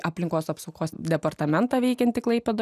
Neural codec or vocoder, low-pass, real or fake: none; 14.4 kHz; real